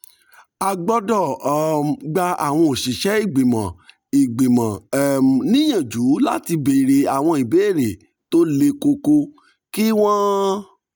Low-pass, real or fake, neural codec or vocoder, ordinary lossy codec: none; real; none; none